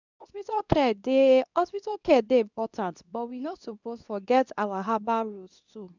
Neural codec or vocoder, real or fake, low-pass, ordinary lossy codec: codec, 24 kHz, 0.9 kbps, WavTokenizer, medium speech release version 2; fake; 7.2 kHz; none